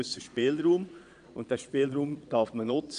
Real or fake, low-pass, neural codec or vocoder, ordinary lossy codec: fake; 9.9 kHz; vocoder, 22.05 kHz, 80 mel bands, Vocos; none